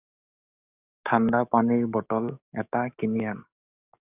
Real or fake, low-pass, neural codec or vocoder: fake; 3.6 kHz; codec, 44.1 kHz, 7.8 kbps, DAC